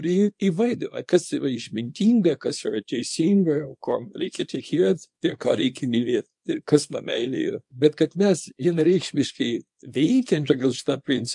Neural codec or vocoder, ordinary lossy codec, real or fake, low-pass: codec, 24 kHz, 0.9 kbps, WavTokenizer, small release; MP3, 64 kbps; fake; 10.8 kHz